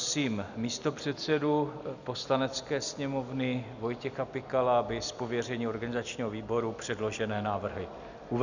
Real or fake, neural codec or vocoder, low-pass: real; none; 7.2 kHz